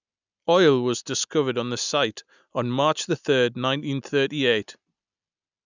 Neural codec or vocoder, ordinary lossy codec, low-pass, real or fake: none; none; 7.2 kHz; real